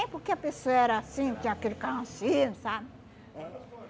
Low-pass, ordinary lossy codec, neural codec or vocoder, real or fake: none; none; none; real